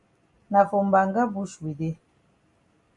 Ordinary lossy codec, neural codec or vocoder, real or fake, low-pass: MP3, 48 kbps; none; real; 10.8 kHz